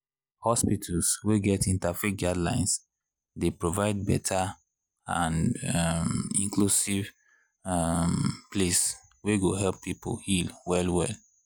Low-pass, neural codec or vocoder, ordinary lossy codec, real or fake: none; none; none; real